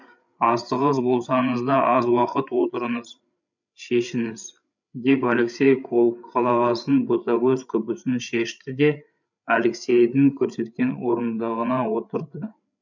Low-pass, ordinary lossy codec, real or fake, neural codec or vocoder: 7.2 kHz; none; fake; codec, 16 kHz, 8 kbps, FreqCodec, larger model